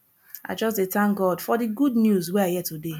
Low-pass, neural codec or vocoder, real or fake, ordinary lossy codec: 19.8 kHz; none; real; none